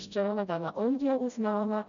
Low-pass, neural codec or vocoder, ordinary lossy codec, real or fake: 7.2 kHz; codec, 16 kHz, 0.5 kbps, FreqCodec, smaller model; none; fake